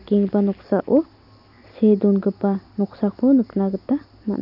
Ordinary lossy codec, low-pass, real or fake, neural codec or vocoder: none; 5.4 kHz; real; none